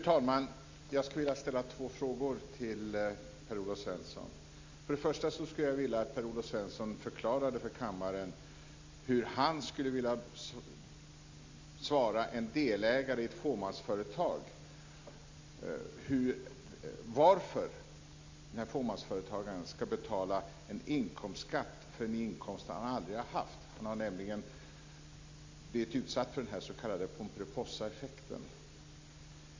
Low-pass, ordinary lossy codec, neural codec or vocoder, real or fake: 7.2 kHz; MP3, 64 kbps; none; real